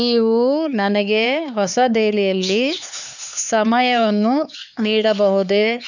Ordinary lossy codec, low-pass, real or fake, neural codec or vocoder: none; 7.2 kHz; fake; codec, 16 kHz, 4 kbps, X-Codec, HuBERT features, trained on LibriSpeech